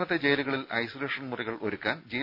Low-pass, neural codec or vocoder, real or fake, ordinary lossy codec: 5.4 kHz; none; real; none